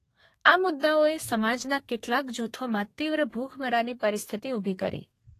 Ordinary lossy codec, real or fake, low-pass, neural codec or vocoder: AAC, 48 kbps; fake; 14.4 kHz; codec, 32 kHz, 1.9 kbps, SNAC